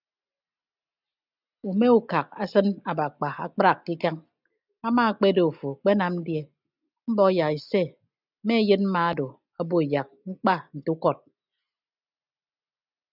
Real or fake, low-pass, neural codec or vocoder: real; 5.4 kHz; none